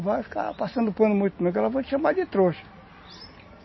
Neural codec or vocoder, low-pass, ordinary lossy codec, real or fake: none; 7.2 kHz; MP3, 24 kbps; real